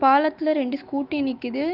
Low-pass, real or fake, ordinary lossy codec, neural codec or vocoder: 5.4 kHz; fake; Opus, 32 kbps; autoencoder, 48 kHz, 128 numbers a frame, DAC-VAE, trained on Japanese speech